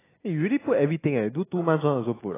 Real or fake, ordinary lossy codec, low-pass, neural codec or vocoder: real; AAC, 16 kbps; 3.6 kHz; none